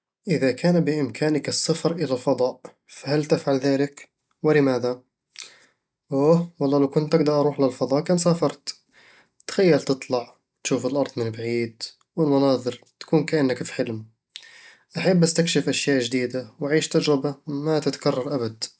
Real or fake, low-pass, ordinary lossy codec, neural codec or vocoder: real; none; none; none